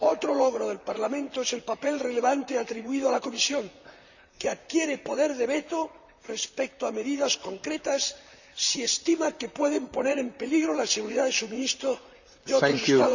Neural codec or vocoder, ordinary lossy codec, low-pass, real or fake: vocoder, 44.1 kHz, 128 mel bands, Pupu-Vocoder; none; 7.2 kHz; fake